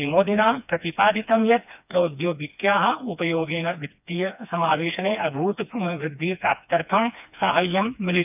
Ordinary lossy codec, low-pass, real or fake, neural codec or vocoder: none; 3.6 kHz; fake; codec, 16 kHz, 2 kbps, FreqCodec, smaller model